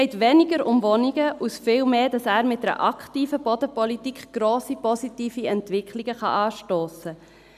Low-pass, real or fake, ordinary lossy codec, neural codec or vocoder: 14.4 kHz; real; none; none